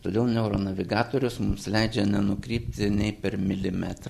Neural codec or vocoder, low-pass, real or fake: none; 14.4 kHz; real